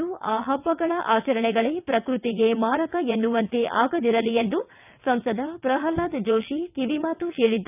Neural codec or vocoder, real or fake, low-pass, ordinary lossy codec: vocoder, 22.05 kHz, 80 mel bands, WaveNeXt; fake; 3.6 kHz; none